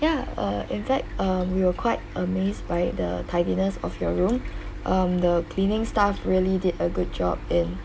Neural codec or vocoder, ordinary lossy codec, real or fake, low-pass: none; none; real; none